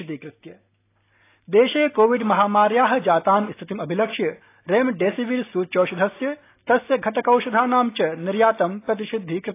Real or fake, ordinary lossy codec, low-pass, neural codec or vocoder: real; AAC, 24 kbps; 3.6 kHz; none